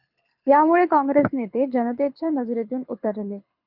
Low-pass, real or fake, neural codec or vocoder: 5.4 kHz; fake; codec, 24 kHz, 6 kbps, HILCodec